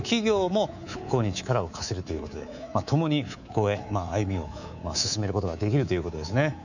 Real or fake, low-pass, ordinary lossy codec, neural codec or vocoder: fake; 7.2 kHz; none; codec, 24 kHz, 3.1 kbps, DualCodec